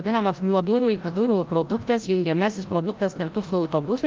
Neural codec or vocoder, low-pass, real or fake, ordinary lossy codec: codec, 16 kHz, 0.5 kbps, FreqCodec, larger model; 7.2 kHz; fake; Opus, 32 kbps